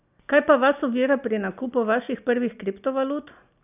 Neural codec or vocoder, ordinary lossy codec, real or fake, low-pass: none; none; real; 3.6 kHz